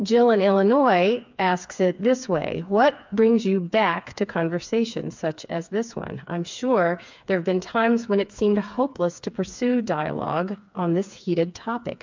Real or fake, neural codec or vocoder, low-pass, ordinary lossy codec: fake; codec, 16 kHz, 4 kbps, FreqCodec, smaller model; 7.2 kHz; MP3, 64 kbps